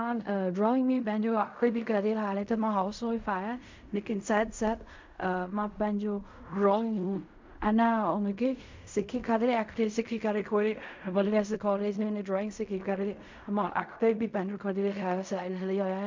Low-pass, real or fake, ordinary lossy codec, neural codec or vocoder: 7.2 kHz; fake; MP3, 64 kbps; codec, 16 kHz in and 24 kHz out, 0.4 kbps, LongCat-Audio-Codec, fine tuned four codebook decoder